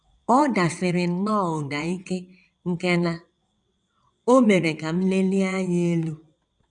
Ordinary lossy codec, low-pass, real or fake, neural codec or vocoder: none; 9.9 kHz; fake; vocoder, 22.05 kHz, 80 mel bands, WaveNeXt